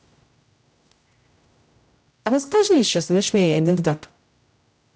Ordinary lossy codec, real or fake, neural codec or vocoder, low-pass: none; fake; codec, 16 kHz, 0.5 kbps, X-Codec, HuBERT features, trained on general audio; none